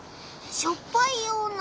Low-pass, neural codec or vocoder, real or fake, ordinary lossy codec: none; none; real; none